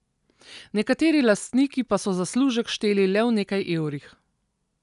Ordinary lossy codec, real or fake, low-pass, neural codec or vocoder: none; real; 10.8 kHz; none